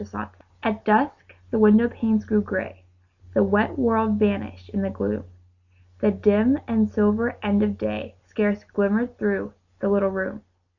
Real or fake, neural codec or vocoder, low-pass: real; none; 7.2 kHz